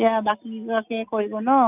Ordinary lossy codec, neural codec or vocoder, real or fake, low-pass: none; none; real; 3.6 kHz